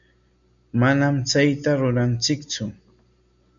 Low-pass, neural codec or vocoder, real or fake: 7.2 kHz; none; real